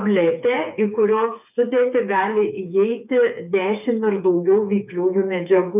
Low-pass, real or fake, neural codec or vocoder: 3.6 kHz; fake; codec, 16 kHz, 4 kbps, FreqCodec, smaller model